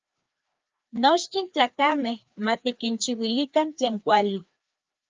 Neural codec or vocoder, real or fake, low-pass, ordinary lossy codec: codec, 16 kHz, 2 kbps, FreqCodec, larger model; fake; 7.2 kHz; Opus, 24 kbps